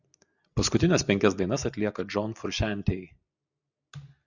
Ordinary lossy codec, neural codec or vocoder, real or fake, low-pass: Opus, 64 kbps; none; real; 7.2 kHz